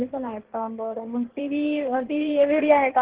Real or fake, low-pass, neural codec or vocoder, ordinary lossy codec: fake; 3.6 kHz; codec, 16 kHz in and 24 kHz out, 1.1 kbps, FireRedTTS-2 codec; Opus, 16 kbps